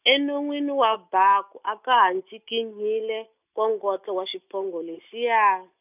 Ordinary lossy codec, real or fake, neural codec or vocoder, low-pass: none; real; none; 3.6 kHz